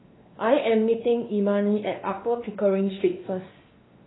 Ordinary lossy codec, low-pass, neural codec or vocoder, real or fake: AAC, 16 kbps; 7.2 kHz; codec, 16 kHz, 2 kbps, X-Codec, WavLM features, trained on Multilingual LibriSpeech; fake